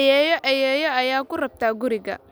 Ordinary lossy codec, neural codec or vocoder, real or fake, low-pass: none; none; real; none